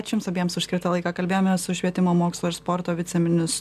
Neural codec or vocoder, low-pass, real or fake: none; 14.4 kHz; real